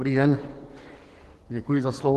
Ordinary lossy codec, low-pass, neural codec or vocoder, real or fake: Opus, 16 kbps; 14.4 kHz; codec, 44.1 kHz, 2.6 kbps, SNAC; fake